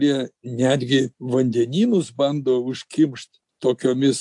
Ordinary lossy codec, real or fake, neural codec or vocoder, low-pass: AAC, 64 kbps; real; none; 10.8 kHz